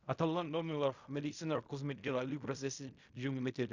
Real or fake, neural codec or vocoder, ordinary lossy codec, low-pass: fake; codec, 16 kHz in and 24 kHz out, 0.4 kbps, LongCat-Audio-Codec, fine tuned four codebook decoder; Opus, 64 kbps; 7.2 kHz